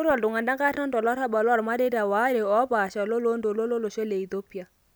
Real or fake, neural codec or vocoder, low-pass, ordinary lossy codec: real; none; none; none